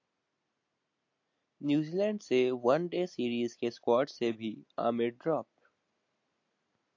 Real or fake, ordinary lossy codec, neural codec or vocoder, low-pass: real; AAC, 48 kbps; none; 7.2 kHz